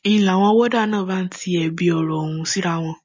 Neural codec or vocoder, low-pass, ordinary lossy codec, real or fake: none; 7.2 kHz; MP3, 32 kbps; real